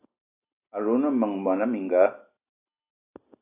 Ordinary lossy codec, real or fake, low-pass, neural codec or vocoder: AAC, 32 kbps; real; 3.6 kHz; none